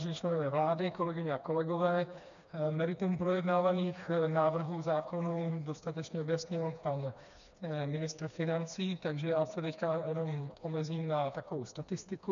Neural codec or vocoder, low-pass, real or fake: codec, 16 kHz, 2 kbps, FreqCodec, smaller model; 7.2 kHz; fake